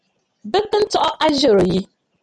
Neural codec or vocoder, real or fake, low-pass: none; real; 10.8 kHz